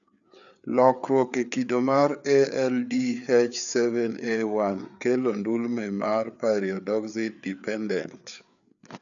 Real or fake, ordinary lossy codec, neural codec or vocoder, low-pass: fake; none; codec, 16 kHz, 4 kbps, FreqCodec, larger model; 7.2 kHz